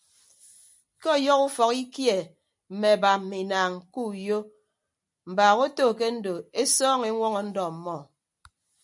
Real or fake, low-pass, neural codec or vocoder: real; 10.8 kHz; none